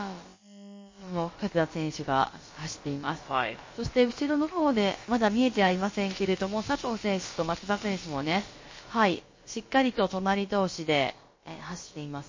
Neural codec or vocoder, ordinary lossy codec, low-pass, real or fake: codec, 16 kHz, about 1 kbps, DyCAST, with the encoder's durations; MP3, 32 kbps; 7.2 kHz; fake